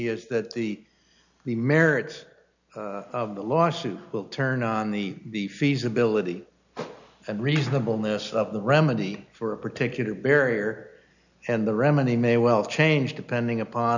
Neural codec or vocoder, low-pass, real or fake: none; 7.2 kHz; real